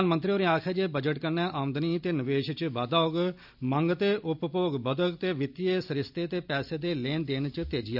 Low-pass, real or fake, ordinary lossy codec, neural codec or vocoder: 5.4 kHz; real; none; none